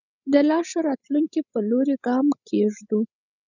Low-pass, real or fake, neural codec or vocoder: 7.2 kHz; fake; codec, 16 kHz, 16 kbps, FreqCodec, larger model